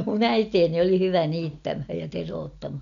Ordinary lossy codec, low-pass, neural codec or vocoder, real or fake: MP3, 96 kbps; 7.2 kHz; none; real